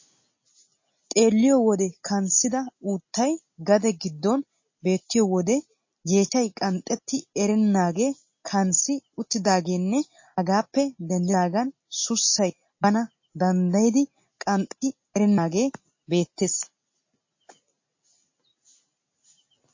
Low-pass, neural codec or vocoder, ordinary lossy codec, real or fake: 7.2 kHz; none; MP3, 32 kbps; real